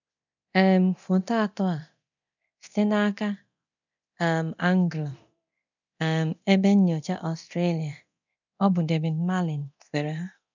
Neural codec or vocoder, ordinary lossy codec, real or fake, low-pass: codec, 24 kHz, 0.9 kbps, DualCodec; none; fake; 7.2 kHz